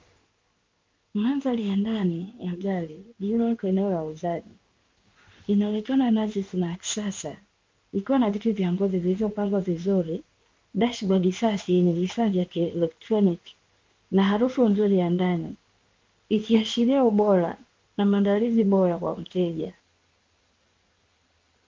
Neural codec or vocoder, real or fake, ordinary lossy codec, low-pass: codec, 16 kHz in and 24 kHz out, 1 kbps, XY-Tokenizer; fake; Opus, 24 kbps; 7.2 kHz